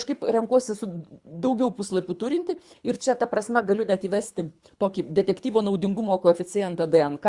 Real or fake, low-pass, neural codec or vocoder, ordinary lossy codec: fake; 10.8 kHz; codec, 24 kHz, 3 kbps, HILCodec; Opus, 64 kbps